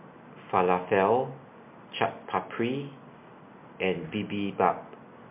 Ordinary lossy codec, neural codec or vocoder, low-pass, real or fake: MP3, 32 kbps; none; 3.6 kHz; real